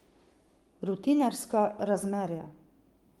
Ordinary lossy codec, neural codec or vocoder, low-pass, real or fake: Opus, 32 kbps; codec, 44.1 kHz, 7.8 kbps, Pupu-Codec; 19.8 kHz; fake